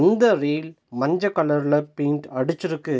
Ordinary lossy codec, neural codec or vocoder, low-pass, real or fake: none; none; none; real